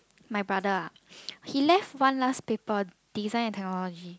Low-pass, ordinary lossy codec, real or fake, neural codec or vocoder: none; none; real; none